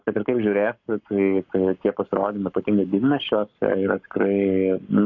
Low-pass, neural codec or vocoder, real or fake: 7.2 kHz; codec, 44.1 kHz, 7.8 kbps, Pupu-Codec; fake